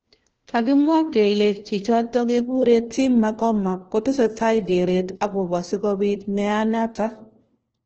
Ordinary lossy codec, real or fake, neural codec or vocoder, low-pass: Opus, 16 kbps; fake; codec, 16 kHz, 1 kbps, FunCodec, trained on LibriTTS, 50 frames a second; 7.2 kHz